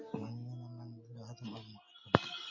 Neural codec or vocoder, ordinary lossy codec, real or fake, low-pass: none; MP3, 32 kbps; real; 7.2 kHz